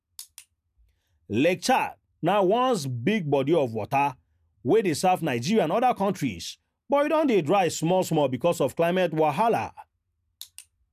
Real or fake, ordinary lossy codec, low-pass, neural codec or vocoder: real; none; 14.4 kHz; none